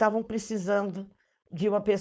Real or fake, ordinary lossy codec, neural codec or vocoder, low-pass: fake; none; codec, 16 kHz, 4.8 kbps, FACodec; none